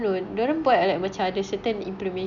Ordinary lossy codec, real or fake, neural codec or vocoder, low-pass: none; real; none; 7.2 kHz